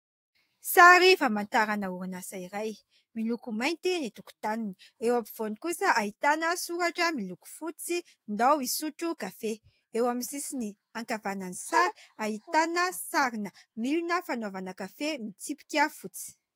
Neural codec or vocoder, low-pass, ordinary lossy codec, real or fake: autoencoder, 48 kHz, 128 numbers a frame, DAC-VAE, trained on Japanese speech; 19.8 kHz; AAC, 48 kbps; fake